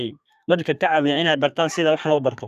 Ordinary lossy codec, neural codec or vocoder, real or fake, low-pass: none; codec, 32 kHz, 1.9 kbps, SNAC; fake; 14.4 kHz